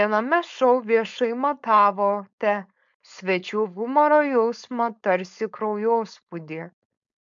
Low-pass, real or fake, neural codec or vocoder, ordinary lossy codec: 7.2 kHz; fake; codec, 16 kHz, 4.8 kbps, FACodec; MP3, 64 kbps